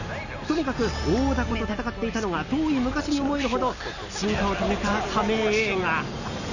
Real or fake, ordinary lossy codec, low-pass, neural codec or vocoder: real; none; 7.2 kHz; none